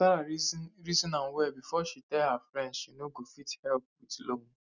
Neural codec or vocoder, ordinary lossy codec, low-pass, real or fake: none; none; 7.2 kHz; real